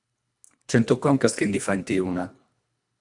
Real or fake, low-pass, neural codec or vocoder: fake; 10.8 kHz; codec, 24 kHz, 1.5 kbps, HILCodec